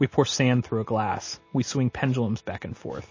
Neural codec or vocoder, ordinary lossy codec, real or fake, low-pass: none; MP3, 32 kbps; real; 7.2 kHz